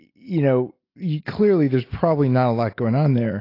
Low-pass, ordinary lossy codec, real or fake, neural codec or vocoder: 5.4 kHz; AAC, 32 kbps; real; none